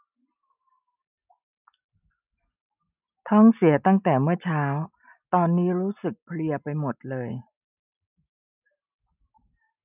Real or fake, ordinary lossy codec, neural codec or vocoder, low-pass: real; none; none; 3.6 kHz